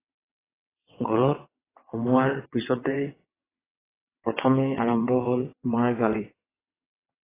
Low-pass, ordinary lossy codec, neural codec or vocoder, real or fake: 3.6 kHz; AAC, 16 kbps; vocoder, 22.05 kHz, 80 mel bands, WaveNeXt; fake